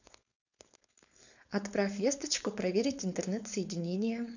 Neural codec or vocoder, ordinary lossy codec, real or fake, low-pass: codec, 16 kHz, 4.8 kbps, FACodec; none; fake; 7.2 kHz